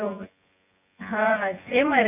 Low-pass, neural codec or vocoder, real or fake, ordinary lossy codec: 3.6 kHz; vocoder, 24 kHz, 100 mel bands, Vocos; fake; none